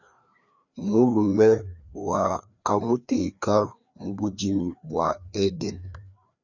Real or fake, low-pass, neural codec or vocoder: fake; 7.2 kHz; codec, 16 kHz, 2 kbps, FreqCodec, larger model